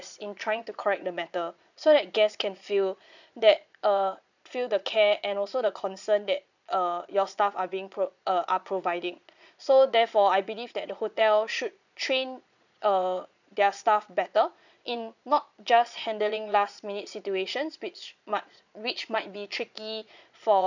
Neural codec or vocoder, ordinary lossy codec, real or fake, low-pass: vocoder, 22.05 kHz, 80 mel bands, Vocos; none; fake; 7.2 kHz